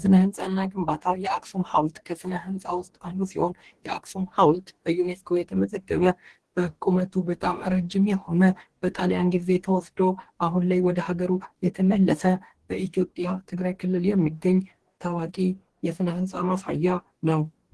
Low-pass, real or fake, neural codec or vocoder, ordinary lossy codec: 10.8 kHz; fake; codec, 44.1 kHz, 2.6 kbps, DAC; Opus, 16 kbps